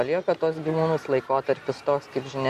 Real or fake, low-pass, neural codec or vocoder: real; 14.4 kHz; none